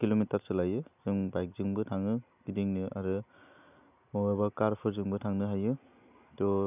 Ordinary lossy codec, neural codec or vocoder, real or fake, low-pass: none; none; real; 3.6 kHz